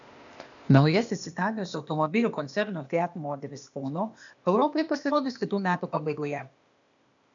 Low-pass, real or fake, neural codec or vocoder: 7.2 kHz; fake; codec, 16 kHz, 0.8 kbps, ZipCodec